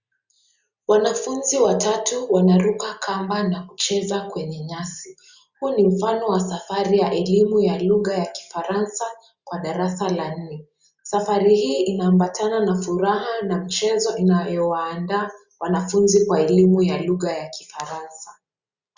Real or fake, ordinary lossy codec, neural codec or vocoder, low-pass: real; Opus, 64 kbps; none; 7.2 kHz